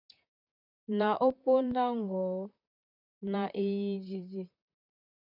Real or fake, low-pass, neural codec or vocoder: fake; 5.4 kHz; vocoder, 44.1 kHz, 128 mel bands, Pupu-Vocoder